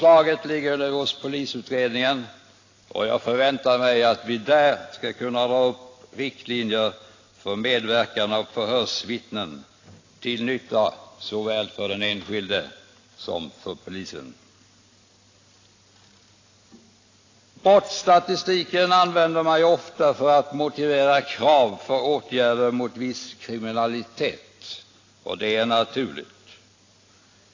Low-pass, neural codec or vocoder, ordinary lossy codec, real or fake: 7.2 kHz; none; AAC, 32 kbps; real